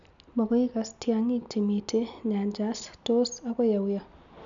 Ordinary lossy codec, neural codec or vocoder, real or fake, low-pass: none; none; real; 7.2 kHz